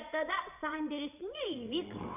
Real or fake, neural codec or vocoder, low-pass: fake; vocoder, 22.05 kHz, 80 mel bands, WaveNeXt; 3.6 kHz